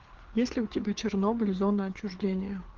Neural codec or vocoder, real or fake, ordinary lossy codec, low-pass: codec, 16 kHz, 4 kbps, FreqCodec, larger model; fake; Opus, 16 kbps; 7.2 kHz